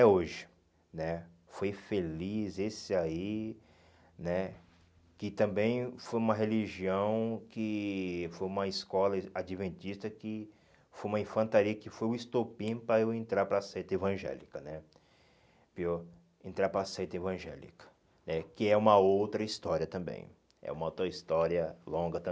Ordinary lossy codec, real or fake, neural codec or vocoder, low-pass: none; real; none; none